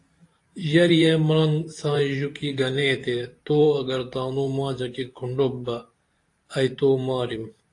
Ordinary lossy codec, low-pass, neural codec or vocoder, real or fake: AAC, 48 kbps; 10.8 kHz; vocoder, 44.1 kHz, 128 mel bands every 512 samples, BigVGAN v2; fake